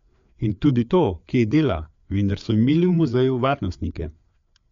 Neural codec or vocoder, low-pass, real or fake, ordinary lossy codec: codec, 16 kHz, 4 kbps, FreqCodec, larger model; 7.2 kHz; fake; MP3, 64 kbps